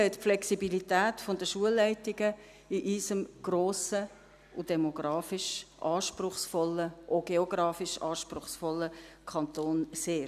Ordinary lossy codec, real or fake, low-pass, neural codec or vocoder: none; real; 14.4 kHz; none